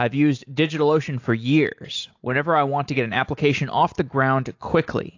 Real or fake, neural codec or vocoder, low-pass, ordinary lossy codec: real; none; 7.2 kHz; AAC, 48 kbps